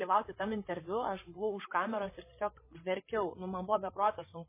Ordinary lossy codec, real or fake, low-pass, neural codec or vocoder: MP3, 16 kbps; fake; 3.6 kHz; codec, 16 kHz, 16 kbps, FreqCodec, larger model